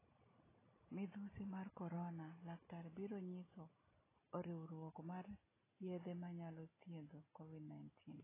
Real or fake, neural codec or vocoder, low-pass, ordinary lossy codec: real; none; 3.6 kHz; AAC, 16 kbps